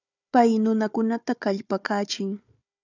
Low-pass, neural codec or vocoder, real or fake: 7.2 kHz; codec, 16 kHz, 4 kbps, FunCodec, trained on Chinese and English, 50 frames a second; fake